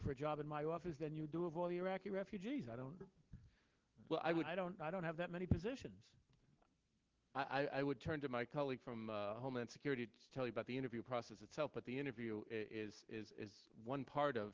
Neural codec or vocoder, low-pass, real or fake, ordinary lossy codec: none; 7.2 kHz; real; Opus, 16 kbps